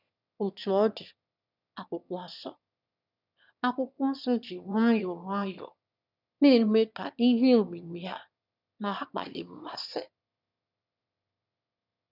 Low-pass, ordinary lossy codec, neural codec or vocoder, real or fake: 5.4 kHz; none; autoencoder, 22.05 kHz, a latent of 192 numbers a frame, VITS, trained on one speaker; fake